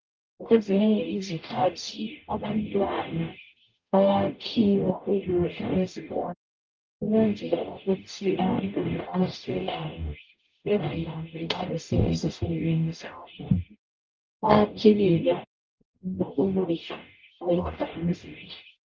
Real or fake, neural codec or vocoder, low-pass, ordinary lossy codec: fake; codec, 44.1 kHz, 0.9 kbps, DAC; 7.2 kHz; Opus, 24 kbps